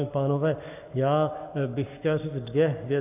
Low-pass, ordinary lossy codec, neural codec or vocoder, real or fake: 3.6 kHz; AAC, 32 kbps; codec, 44.1 kHz, 7.8 kbps, Pupu-Codec; fake